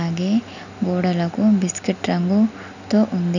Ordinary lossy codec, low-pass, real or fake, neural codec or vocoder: none; 7.2 kHz; real; none